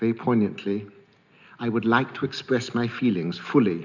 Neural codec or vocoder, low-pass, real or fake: none; 7.2 kHz; real